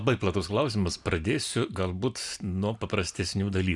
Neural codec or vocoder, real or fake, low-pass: none; real; 10.8 kHz